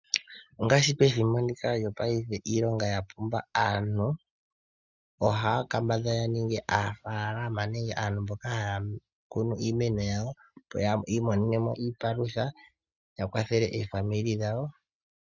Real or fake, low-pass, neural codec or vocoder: real; 7.2 kHz; none